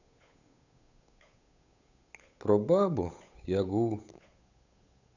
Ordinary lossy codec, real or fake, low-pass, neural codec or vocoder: none; fake; 7.2 kHz; codec, 16 kHz, 8 kbps, FunCodec, trained on Chinese and English, 25 frames a second